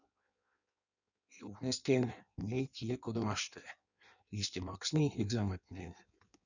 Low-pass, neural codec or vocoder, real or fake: 7.2 kHz; codec, 16 kHz in and 24 kHz out, 1.1 kbps, FireRedTTS-2 codec; fake